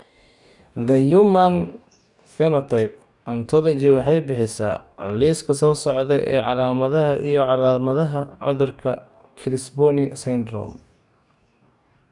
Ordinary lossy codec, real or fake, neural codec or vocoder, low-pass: none; fake; codec, 44.1 kHz, 2.6 kbps, DAC; 10.8 kHz